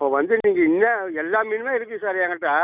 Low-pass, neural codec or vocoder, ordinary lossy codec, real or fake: 3.6 kHz; none; none; real